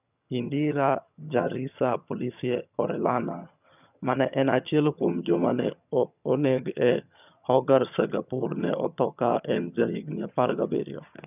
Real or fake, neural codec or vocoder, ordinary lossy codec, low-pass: fake; vocoder, 22.05 kHz, 80 mel bands, HiFi-GAN; none; 3.6 kHz